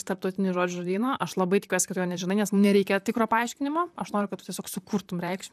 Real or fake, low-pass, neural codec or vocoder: fake; 14.4 kHz; vocoder, 44.1 kHz, 128 mel bands, Pupu-Vocoder